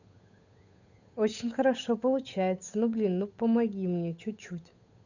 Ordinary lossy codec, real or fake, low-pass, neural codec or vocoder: none; fake; 7.2 kHz; codec, 16 kHz, 8 kbps, FunCodec, trained on Chinese and English, 25 frames a second